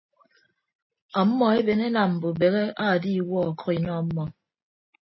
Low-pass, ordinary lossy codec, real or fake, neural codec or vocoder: 7.2 kHz; MP3, 24 kbps; real; none